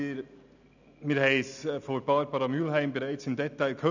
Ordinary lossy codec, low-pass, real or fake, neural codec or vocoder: none; 7.2 kHz; real; none